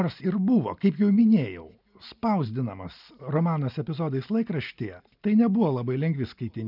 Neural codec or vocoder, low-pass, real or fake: none; 5.4 kHz; real